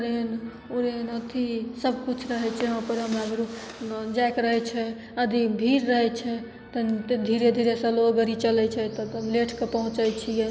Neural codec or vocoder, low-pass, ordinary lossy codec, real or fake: none; none; none; real